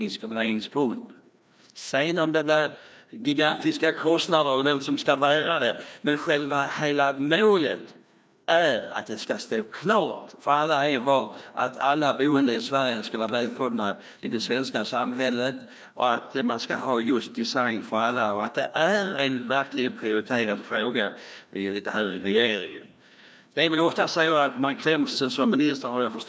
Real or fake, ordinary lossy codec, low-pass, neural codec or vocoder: fake; none; none; codec, 16 kHz, 1 kbps, FreqCodec, larger model